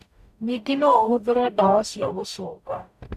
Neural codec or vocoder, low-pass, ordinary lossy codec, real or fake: codec, 44.1 kHz, 0.9 kbps, DAC; 14.4 kHz; none; fake